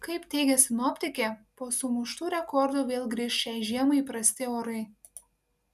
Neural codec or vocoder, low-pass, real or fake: none; 14.4 kHz; real